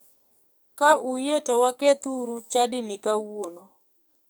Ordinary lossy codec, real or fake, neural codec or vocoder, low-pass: none; fake; codec, 44.1 kHz, 2.6 kbps, SNAC; none